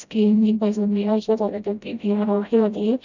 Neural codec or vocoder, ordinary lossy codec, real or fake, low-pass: codec, 16 kHz, 0.5 kbps, FreqCodec, smaller model; none; fake; 7.2 kHz